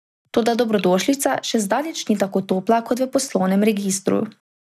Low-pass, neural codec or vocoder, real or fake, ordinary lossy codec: 14.4 kHz; none; real; none